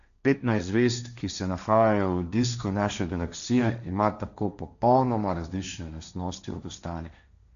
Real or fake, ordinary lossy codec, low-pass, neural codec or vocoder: fake; none; 7.2 kHz; codec, 16 kHz, 1.1 kbps, Voila-Tokenizer